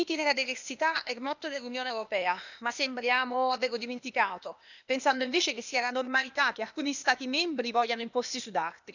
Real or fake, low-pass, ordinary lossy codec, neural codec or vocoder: fake; 7.2 kHz; none; codec, 16 kHz, 0.8 kbps, ZipCodec